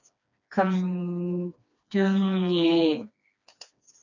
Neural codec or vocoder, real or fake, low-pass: codec, 16 kHz, 2 kbps, FreqCodec, smaller model; fake; 7.2 kHz